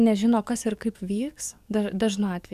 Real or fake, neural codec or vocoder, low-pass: fake; codec, 44.1 kHz, 7.8 kbps, DAC; 14.4 kHz